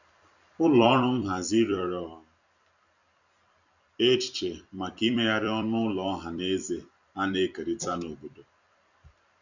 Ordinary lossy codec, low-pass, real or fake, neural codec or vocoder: none; 7.2 kHz; fake; vocoder, 44.1 kHz, 128 mel bands every 256 samples, BigVGAN v2